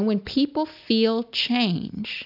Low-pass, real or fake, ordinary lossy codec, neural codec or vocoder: 5.4 kHz; real; AAC, 48 kbps; none